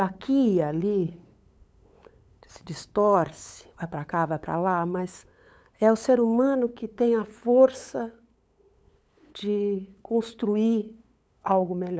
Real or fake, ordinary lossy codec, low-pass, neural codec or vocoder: fake; none; none; codec, 16 kHz, 8 kbps, FunCodec, trained on LibriTTS, 25 frames a second